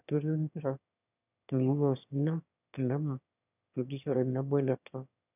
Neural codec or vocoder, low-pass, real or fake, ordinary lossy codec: autoencoder, 22.05 kHz, a latent of 192 numbers a frame, VITS, trained on one speaker; 3.6 kHz; fake; none